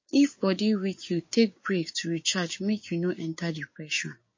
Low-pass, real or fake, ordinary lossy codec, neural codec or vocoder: 7.2 kHz; fake; MP3, 32 kbps; codec, 16 kHz, 6 kbps, DAC